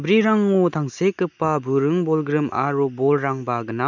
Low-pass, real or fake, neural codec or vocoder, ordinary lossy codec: 7.2 kHz; real; none; none